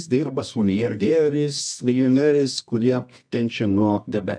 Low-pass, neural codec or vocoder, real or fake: 9.9 kHz; codec, 24 kHz, 0.9 kbps, WavTokenizer, medium music audio release; fake